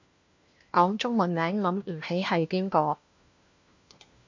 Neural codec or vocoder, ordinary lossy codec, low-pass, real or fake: codec, 16 kHz, 1 kbps, FunCodec, trained on LibriTTS, 50 frames a second; MP3, 48 kbps; 7.2 kHz; fake